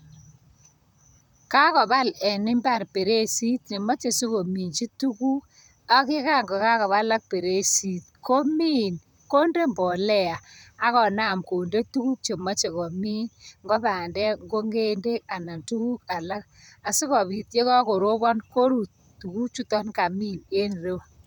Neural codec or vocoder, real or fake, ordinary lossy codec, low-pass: vocoder, 44.1 kHz, 128 mel bands, Pupu-Vocoder; fake; none; none